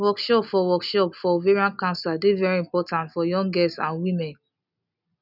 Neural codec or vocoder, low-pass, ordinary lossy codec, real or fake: none; 5.4 kHz; none; real